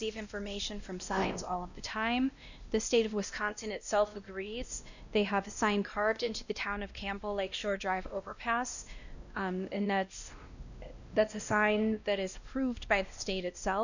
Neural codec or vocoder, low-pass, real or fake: codec, 16 kHz, 1 kbps, X-Codec, WavLM features, trained on Multilingual LibriSpeech; 7.2 kHz; fake